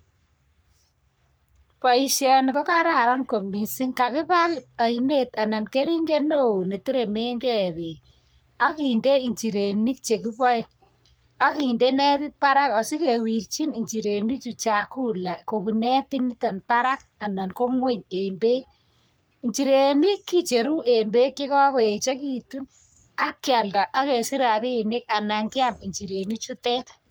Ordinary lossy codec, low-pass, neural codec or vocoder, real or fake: none; none; codec, 44.1 kHz, 3.4 kbps, Pupu-Codec; fake